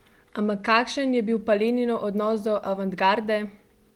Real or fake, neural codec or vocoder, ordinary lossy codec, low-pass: real; none; Opus, 24 kbps; 19.8 kHz